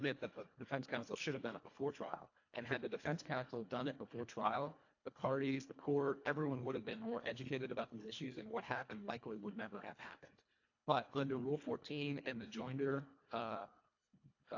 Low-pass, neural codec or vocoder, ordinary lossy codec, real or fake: 7.2 kHz; codec, 24 kHz, 1.5 kbps, HILCodec; AAC, 48 kbps; fake